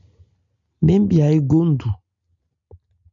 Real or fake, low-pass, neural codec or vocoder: real; 7.2 kHz; none